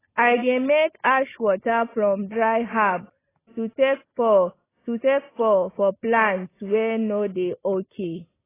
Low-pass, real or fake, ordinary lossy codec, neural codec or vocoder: 3.6 kHz; real; AAC, 16 kbps; none